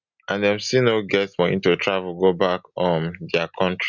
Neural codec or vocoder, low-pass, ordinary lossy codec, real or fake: none; 7.2 kHz; none; real